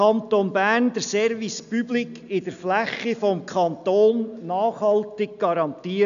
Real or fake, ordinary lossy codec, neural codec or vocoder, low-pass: real; none; none; 7.2 kHz